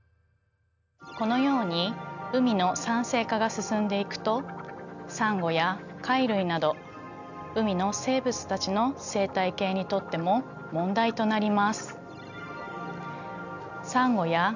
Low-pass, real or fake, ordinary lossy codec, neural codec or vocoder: 7.2 kHz; real; none; none